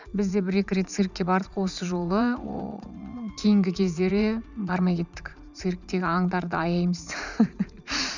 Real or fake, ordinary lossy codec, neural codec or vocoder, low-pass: fake; none; vocoder, 44.1 kHz, 80 mel bands, Vocos; 7.2 kHz